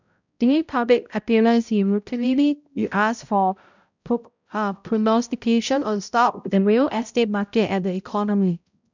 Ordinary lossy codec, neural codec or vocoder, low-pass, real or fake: none; codec, 16 kHz, 0.5 kbps, X-Codec, HuBERT features, trained on balanced general audio; 7.2 kHz; fake